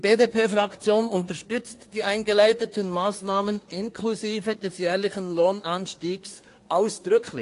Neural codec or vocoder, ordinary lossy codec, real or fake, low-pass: codec, 24 kHz, 1 kbps, SNAC; AAC, 48 kbps; fake; 10.8 kHz